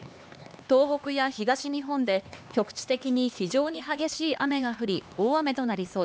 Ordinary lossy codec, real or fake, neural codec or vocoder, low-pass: none; fake; codec, 16 kHz, 2 kbps, X-Codec, HuBERT features, trained on LibriSpeech; none